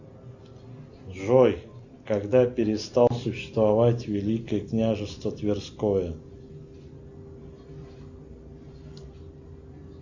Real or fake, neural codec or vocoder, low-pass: real; none; 7.2 kHz